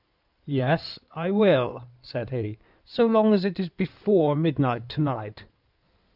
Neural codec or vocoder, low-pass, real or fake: codec, 16 kHz in and 24 kHz out, 2.2 kbps, FireRedTTS-2 codec; 5.4 kHz; fake